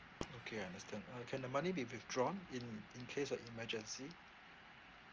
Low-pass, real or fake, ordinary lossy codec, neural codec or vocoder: 7.2 kHz; real; Opus, 24 kbps; none